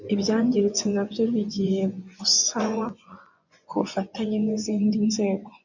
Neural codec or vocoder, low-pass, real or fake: vocoder, 24 kHz, 100 mel bands, Vocos; 7.2 kHz; fake